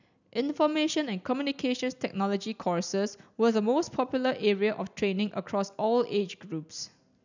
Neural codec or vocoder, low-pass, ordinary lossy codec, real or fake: none; 7.2 kHz; none; real